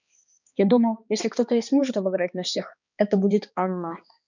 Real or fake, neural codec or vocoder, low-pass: fake; codec, 16 kHz, 2 kbps, X-Codec, HuBERT features, trained on balanced general audio; 7.2 kHz